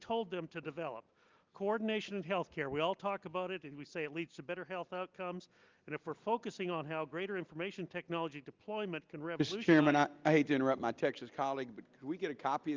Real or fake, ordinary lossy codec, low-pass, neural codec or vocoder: real; Opus, 24 kbps; 7.2 kHz; none